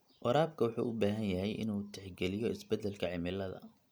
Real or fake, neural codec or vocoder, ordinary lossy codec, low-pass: real; none; none; none